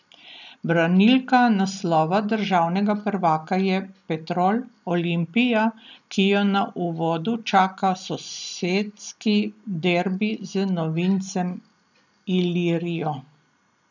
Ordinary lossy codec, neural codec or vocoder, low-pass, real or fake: none; none; 7.2 kHz; real